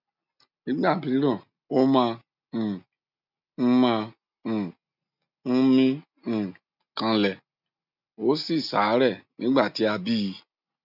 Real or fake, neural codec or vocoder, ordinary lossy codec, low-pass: real; none; none; 5.4 kHz